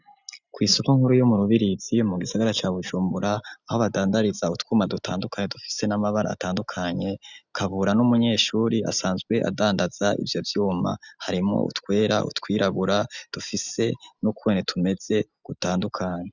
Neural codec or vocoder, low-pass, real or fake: none; 7.2 kHz; real